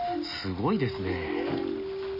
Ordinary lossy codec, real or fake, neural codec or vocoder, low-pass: none; real; none; 5.4 kHz